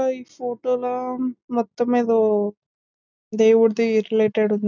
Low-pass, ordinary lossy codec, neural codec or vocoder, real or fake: 7.2 kHz; none; none; real